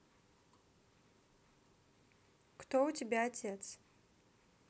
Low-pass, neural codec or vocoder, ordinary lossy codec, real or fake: none; none; none; real